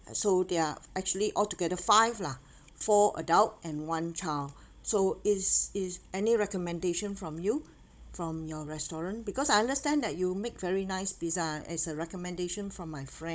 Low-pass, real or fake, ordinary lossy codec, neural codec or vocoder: none; fake; none; codec, 16 kHz, 16 kbps, FunCodec, trained on Chinese and English, 50 frames a second